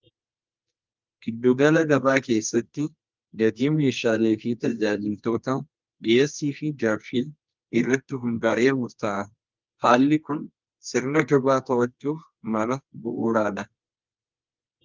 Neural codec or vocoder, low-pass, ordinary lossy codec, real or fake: codec, 24 kHz, 0.9 kbps, WavTokenizer, medium music audio release; 7.2 kHz; Opus, 32 kbps; fake